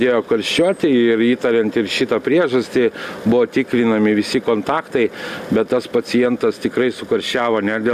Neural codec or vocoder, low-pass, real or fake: none; 14.4 kHz; real